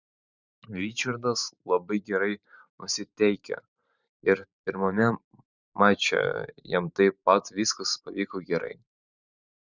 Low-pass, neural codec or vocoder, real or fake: 7.2 kHz; vocoder, 44.1 kHz, 128 mel bands every 512 samples, BigVGAN v2; fake